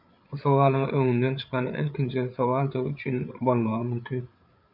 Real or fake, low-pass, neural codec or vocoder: fake; 5.4 kHz; codec, 16 kHz, 8 kbps, FreqCodec, larger model